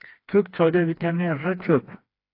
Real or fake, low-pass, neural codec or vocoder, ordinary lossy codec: fake; 5.4 kHz; codec, 16 kHz, 2 kbps, FreqCodec, smaller model; AAC, 32 kbps